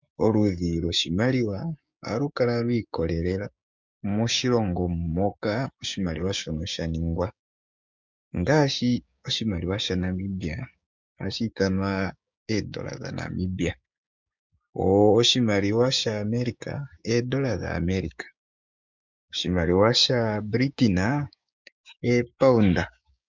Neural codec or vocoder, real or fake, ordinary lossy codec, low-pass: codec, 16 kHz, 6 kbps, DAC; fake; MP3, 64 kbps; 7.2 kHz